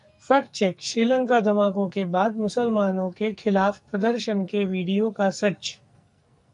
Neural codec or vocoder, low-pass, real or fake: codec, 44.1 kHz, 2.6 kbps, SNAC; 10.8 kHz; fake